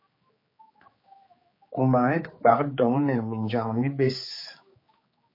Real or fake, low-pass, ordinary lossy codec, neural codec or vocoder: fake; 5.4 kHz; MP3, 24 kbps; codec, 16 kHz, 4 kbps, X-Codec, HuBERT features, trained on general audio